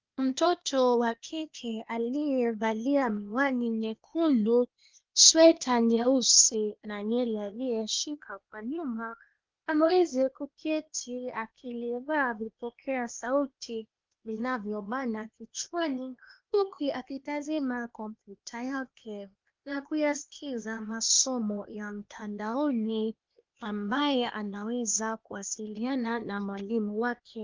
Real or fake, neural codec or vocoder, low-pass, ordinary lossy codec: fake; codec, 16 kHz, 0.8 kbps, ZipCodec; 7.2 kHz; Opus, 24 kbps